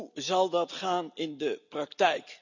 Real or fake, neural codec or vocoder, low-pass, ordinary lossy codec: real; none; 7.2 kHz; none